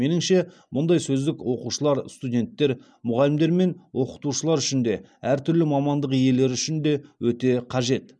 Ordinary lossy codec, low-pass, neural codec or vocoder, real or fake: none; none; none; real